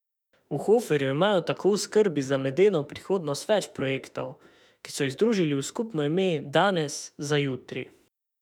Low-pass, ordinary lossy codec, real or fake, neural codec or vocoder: 19.8 kHz; none; fake; autoencoder, 48 kHz, 32 numbers a frame, DAC-VAE, trained on Japanese speech